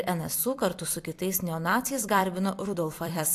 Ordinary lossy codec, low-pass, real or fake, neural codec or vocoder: AAC, 64 kbps; 14.4 kHz; fake; vocoder, 44.1 kHz, 128 mel bands every 256 samples, BigVGAN v2